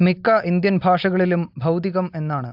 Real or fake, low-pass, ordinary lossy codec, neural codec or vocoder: real; 5.4 kHz; AAC, 48 kbps; none